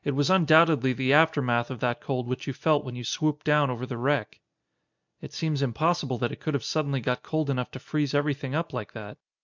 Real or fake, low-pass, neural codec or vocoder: real; 7.2 kHz; none